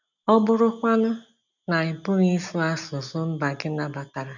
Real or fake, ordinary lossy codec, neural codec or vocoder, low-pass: real; none; none; 7.2 kHz